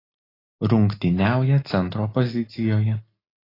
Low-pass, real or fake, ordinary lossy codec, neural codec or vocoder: 5.4 kHz; real; AAC, 24 kbps; none